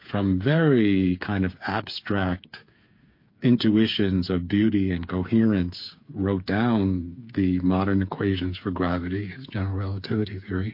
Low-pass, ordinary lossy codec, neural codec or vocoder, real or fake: 5.4 kHz; MP3, 32 kbps; codec, 16 kHz, 8 kbps, FreqCodec, smaller model; fake